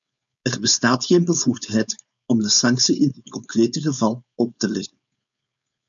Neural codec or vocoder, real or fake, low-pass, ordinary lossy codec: codec, 16 kHz, 4.8 kbps, FACodec; fake; 7.2 kHz; MP3, 96 kbps